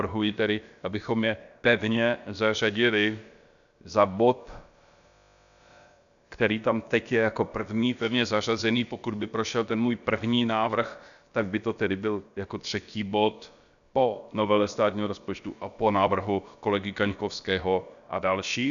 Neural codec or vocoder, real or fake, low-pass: codec, 16 kHz, about 1 kbps, DyCAST, with the encoder's durations; fake; 7.2 kHz